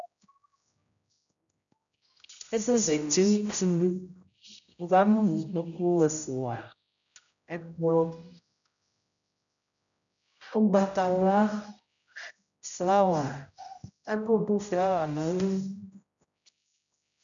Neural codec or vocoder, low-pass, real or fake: codec, 16 kHz, 0.5 kbps, X-Codec, HuBERT features, trained on general audio; 7.2 kHz; fake